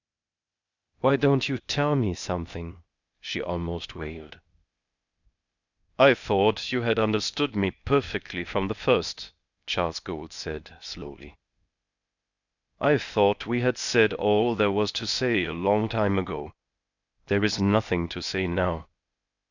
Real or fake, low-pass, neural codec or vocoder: fake; 7.2 kHz; codec, 16 kHz, 0.8 kbps, ZipCodec